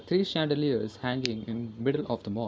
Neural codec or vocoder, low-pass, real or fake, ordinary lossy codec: none; none; real; none